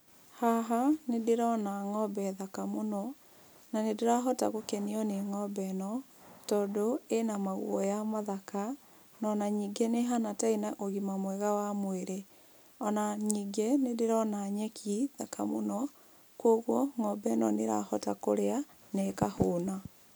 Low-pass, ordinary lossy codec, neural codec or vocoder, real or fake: none; none; none; real